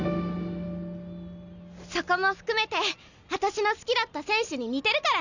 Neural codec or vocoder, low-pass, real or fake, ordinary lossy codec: none; 7.2 kHz; real; none